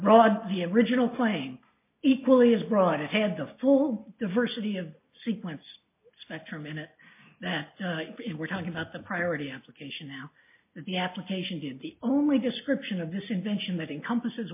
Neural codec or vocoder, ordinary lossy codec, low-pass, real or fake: none; MP3, 24 kbps; 3.6 kHz; real